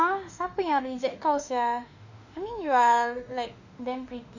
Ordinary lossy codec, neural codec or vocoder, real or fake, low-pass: none; autoencoder, 48 kHz, 32 numbers a frame, DAC-VAE, trained on Japanese speech; fake; 7.2 kHz